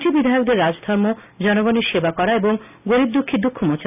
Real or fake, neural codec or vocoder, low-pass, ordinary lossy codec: real; none; 3.6 kHz; none